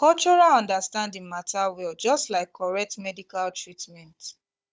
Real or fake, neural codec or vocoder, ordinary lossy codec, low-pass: fake; codec, 16 kHz, 16 kbps, FunCodec, trained on Chinese and English, 50 frames a second; none; none